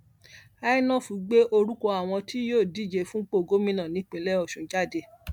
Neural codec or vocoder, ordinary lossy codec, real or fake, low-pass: none; MP3, 96 kbps; real; 19.8 kHz